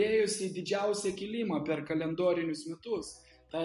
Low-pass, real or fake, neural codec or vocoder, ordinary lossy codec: 14.4 kHz; real; none; MP3, 48 kbps